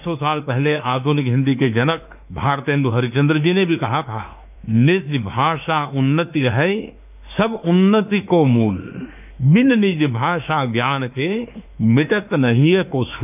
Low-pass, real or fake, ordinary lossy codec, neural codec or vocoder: 3.6 kHz; fake; none; autoencoder, 48 kHz, 32 numbers a frame, DAC-VAE, trained on Japanese speech